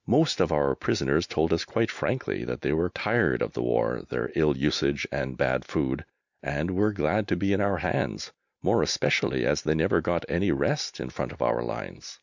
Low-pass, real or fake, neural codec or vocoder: 7.2 kHz; real; none